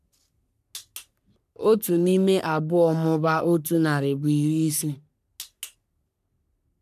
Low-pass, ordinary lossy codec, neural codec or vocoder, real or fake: 14.4 kHz; none; codec, 44.1 kHz, 3.4 kbps, Pupu-Codec; fake